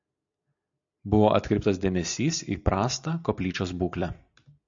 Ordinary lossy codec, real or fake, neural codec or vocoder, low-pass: AAC, 64 kbps; real; none; 7.2 kHz